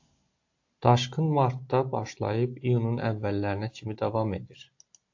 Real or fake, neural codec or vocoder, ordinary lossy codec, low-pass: real; none; AAC, 48 kbps; 7.2 kHz